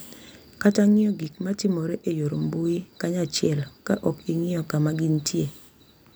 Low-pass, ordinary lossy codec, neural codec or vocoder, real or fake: none; none; none; real